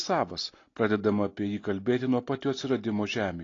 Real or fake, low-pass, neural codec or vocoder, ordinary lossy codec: real; 7.2 kHz; none; AAC, 32 kbps